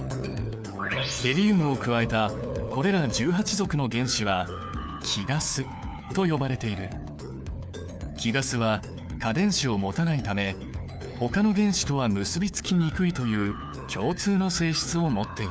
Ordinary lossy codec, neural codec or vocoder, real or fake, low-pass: none; codec, 16 kHz, 4 kbps, FunCodec, trained on LibriTTS, 50 frames a second; fake; none